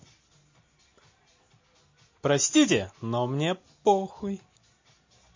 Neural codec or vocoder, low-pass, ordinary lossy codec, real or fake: none; 7.2 kHz; MP3, 32 kbps; real